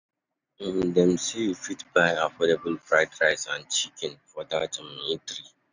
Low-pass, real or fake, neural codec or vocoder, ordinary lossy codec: 7.2 kHz; real; none; none